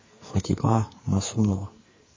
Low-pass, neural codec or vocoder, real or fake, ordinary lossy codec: 7.2 kHz; codec, 44.1 kHz, 2.6 kbps, SNAC; fake; MP3, 32 kbps